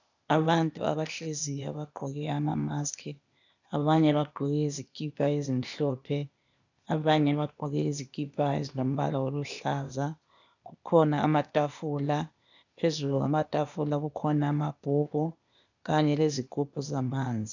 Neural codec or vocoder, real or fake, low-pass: codec, 16 kHz, 0.8 kbps, ZipCodec; fake; 7.2 kHz